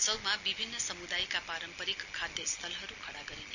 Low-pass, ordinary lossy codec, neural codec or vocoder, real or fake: 7.2 kHz; none; none; real